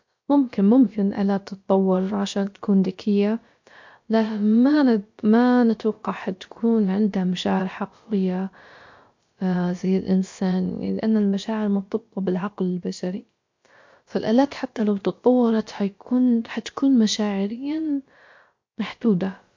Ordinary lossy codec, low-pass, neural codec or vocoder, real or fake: MP3, 48 kbps; 7.2 kHz; codec, 16 kHz, about 1 kbps, DyCAST, with the encoder's durations; fake